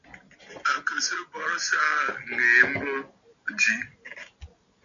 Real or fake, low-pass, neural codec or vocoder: real; 7.2 kHz; none